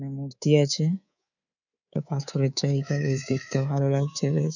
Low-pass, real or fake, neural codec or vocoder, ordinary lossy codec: 7.2 kHz; fake; codec, 24 kHz, 3.1 kbps, DualCodec; none